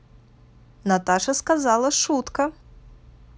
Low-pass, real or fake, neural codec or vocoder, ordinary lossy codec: none; real; none; none